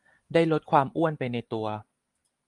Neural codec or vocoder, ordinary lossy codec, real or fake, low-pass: none; Opus, 32 kbps; real; 10.8 kHz